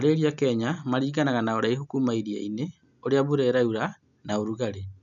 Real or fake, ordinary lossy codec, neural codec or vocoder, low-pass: real; none; none; 7.2 kHz